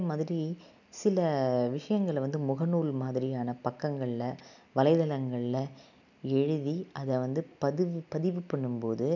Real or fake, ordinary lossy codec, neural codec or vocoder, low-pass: real; none; none; 7.2 kHz